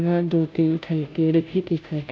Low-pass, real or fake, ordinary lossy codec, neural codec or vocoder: none; fake; none; codec, 16 kHz, 0.5 kbps, FunCodec, trained on Chinese and English, 25 frames a second